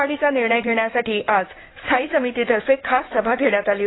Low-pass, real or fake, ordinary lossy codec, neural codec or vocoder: 7.2 kHz; fake; AAC, 16 kbps; codec, 16 kHz in and 24 kHz out, 2.2 kbps, FireRedTTS-2 codec